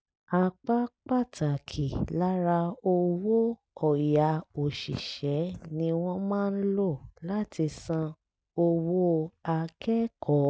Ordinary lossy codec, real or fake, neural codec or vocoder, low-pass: none; real; none; none